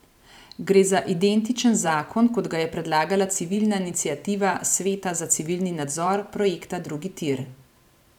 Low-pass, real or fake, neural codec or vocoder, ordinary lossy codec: 19.8 kHz; fake; vocoder, 44.1 kHz, 128 mel bands every 512 samples, BigVGAN v2; none